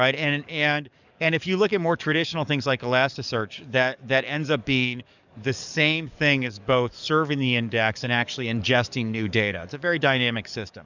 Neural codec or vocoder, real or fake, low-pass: codec, 44.1 kHz, 7.8 kbps, DAC; fake; 7.2 kHz